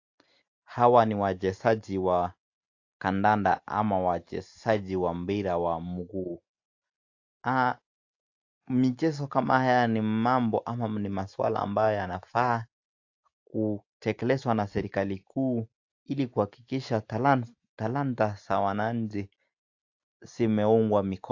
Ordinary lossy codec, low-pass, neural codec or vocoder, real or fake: AAC, 48 kbps; 7.2 kHz; none; real